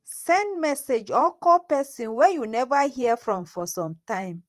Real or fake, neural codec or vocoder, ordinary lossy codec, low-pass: fake; vocoder, 44.1 kHz, 128 mel bands every 512 samples, BigVGAN v2; Opus, 24 kbps; 14.4 kHz